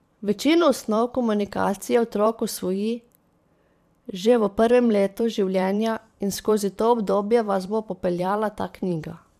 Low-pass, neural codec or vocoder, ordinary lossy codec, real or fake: 14.4 kHz; vocoder, 44.1 kHz, 128 mel bands every 512 samples, BigVGAN v2; AAC, 96 kbps; fake